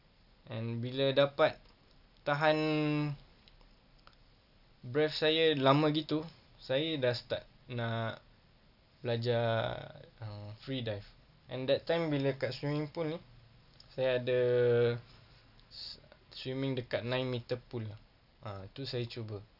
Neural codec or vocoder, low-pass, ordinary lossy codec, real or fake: none; 5.4 kHz; none; real